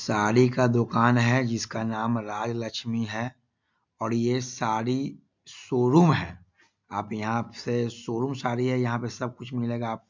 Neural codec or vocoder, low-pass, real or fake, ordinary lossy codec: none; 7.2 kHz; real; MP3, 64 kbps